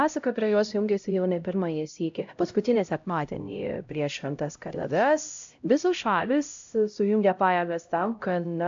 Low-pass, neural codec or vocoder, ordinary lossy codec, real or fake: 7.2 kHz; codec, 16 kHz, 0.5 kbps, X-Codec, HuBERT features, trained on LibriSpeech; AAC, 64 kbps; fake